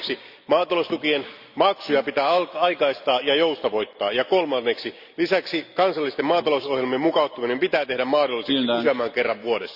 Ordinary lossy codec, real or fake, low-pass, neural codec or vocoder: Opus, 64 kbps; real; 5.4 kHz; none